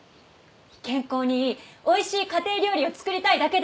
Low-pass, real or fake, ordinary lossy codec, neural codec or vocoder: none; real; none; none